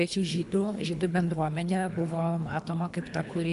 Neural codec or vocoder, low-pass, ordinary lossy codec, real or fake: codec, 24 kHz, 3 kbps, HILCodec; 10.8 kHz; AAC, 96 kbps; fake